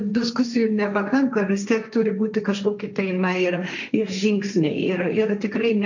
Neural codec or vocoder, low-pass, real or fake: codec, 16 kHz, 1.1 kbps, Voila-Tokenizer; 7.2 kHz; fake